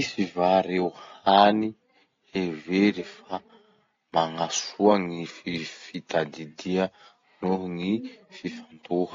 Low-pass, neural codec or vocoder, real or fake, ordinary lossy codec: 7.2 kHz; none; real; AAC, 32 kbps